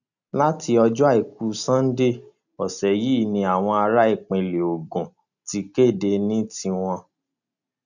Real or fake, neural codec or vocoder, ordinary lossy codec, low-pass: real; none; none; 7.2 kHz